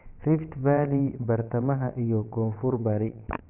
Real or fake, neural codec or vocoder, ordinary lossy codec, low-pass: fake; vocoder, 22.05 kHz, 80 mel bands, WaveNeXt; none; 3.6 kHz